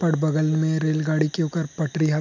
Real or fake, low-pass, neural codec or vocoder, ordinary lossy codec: real; 7.2 kHz; none; none